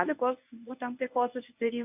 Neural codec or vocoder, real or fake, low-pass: codec, 24 kHz, 0.9 kbps, WavTokenizer, medium speech release version 1; fake; 3.6 kHz